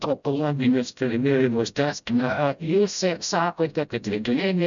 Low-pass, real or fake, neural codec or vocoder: 7.2 kHz; fake; codec, 16 kHz, 0.5 kbps, FreqCodec, smaller model